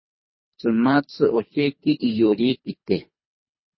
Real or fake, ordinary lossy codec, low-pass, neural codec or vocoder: fake; MP3, 24 kbps; 7.2 kHz; codec, 24 kHz, 3 kbps, HILCodec